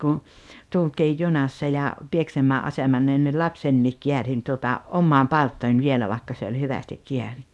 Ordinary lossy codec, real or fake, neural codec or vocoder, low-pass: none; fake; codec, 24 kHz, 0.9 kbps, WavTokenizer, small release; none